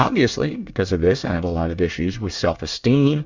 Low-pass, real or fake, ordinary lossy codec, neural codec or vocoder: 7.2 kHz; fake; Opus, 64 kbps; codec, 24 kHz, 1 kbps, SNAC